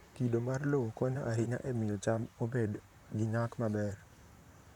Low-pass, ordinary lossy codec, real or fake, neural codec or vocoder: 19.8 kHz; none; fake; vocoder, 44.1 kHz, 128 mel bands, Pupu-Vocoder